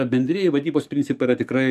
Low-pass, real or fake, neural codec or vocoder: 14.4 kHz; fake; codec, 44.1 kHz, 7.8 kbps, DAC